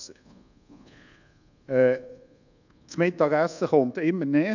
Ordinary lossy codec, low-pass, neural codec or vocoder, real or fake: none; 7.2 kHz; codec, 24 kHz, 1.2 kbps, DualCodec; fake